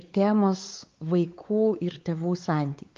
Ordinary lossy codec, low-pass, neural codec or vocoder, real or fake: Opus, 32 kbps; 7.2 kHz; codec, 16 kHz, 4 kbps, X-Codec, WavLM features, trained on Multilingual LibriSpeech; fake